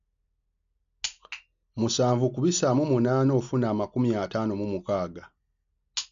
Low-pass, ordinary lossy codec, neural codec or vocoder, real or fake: 7.2 kHz; MP3, 64 kbps; none; real